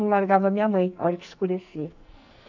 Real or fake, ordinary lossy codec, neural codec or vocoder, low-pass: fake; none; codec, 44.1 kHz, 2.6 kbps, SNAC; 7.2 kHz